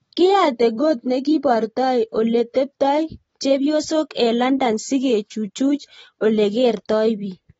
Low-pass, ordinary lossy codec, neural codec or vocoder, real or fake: 19.8 kHz; AAC, 24 kbps; none; real